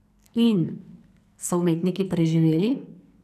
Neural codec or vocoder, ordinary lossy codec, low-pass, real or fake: codec, 44.1 kHz, 2.6 kbps, SNAC; none; 14.4 kHz; fake